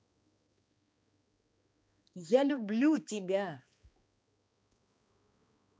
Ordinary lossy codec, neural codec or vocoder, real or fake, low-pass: none; codec, 16 kHz, 2 kbps, X-Codec, HuBERT features, trained on balanced general audio; fake; none